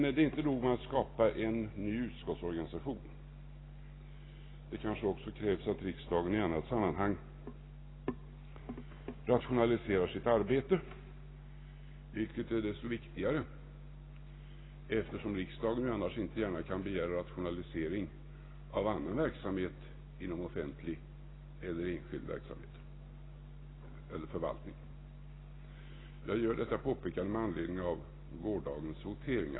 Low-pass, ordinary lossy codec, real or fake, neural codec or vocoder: 7.2 kHz; AAC, 16 kbps; real; none